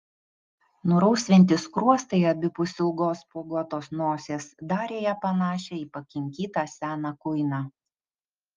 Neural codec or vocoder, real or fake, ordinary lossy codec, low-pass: none; real; Opus, 32 kbps; 7.2 kHz